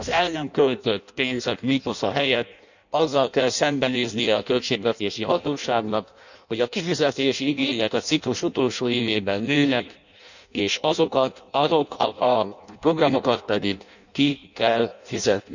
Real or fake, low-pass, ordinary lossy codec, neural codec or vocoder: fake; 7.2 kHz; none; codec, 16 kHz in and 24 kHz out, 0.6 kbps, FireRedTTS-2 codec